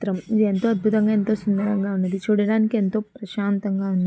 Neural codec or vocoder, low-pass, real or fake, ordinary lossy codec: none; none; real; none